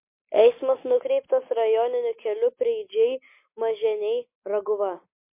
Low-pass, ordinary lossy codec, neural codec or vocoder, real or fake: 3.6 kHz; MP3, 24 kbps; none; real